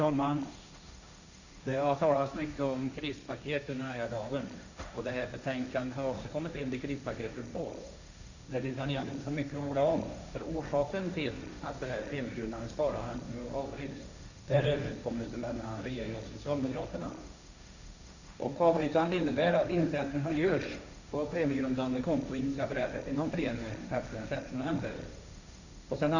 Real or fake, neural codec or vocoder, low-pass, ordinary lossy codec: fake; codec, 16 kHz, 1.1 kbps, Voila-Tokenizer; 7.2 kHz; none